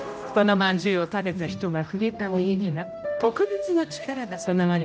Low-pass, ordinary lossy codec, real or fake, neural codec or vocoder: none; none; fake; codec, 16 kHz, 0.5 kbps, X-Codec, HuBERT features, trained on general audio